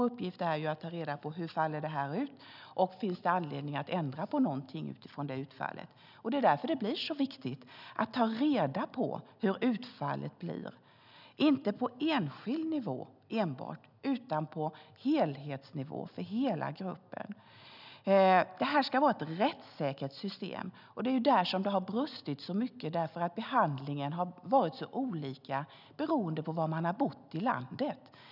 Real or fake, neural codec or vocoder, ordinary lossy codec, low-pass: real; none; none; 5.4 kHz